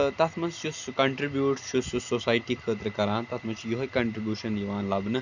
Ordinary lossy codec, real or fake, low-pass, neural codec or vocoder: none; real; 7.2 kHz; none